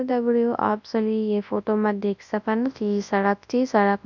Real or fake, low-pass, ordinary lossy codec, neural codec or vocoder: fake; 7.2 kHz; none; codec, 24 kHz, 0.9 kbps, WavTokenizer, large speech release